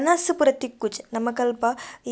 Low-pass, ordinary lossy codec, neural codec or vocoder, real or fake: none; none; none; real